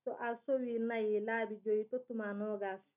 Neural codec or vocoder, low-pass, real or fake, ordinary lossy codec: none; 3.6 kHz; real; none